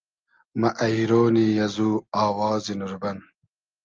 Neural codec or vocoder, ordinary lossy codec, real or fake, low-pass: none; Opus, 24 kbps; real; 7.2 kHz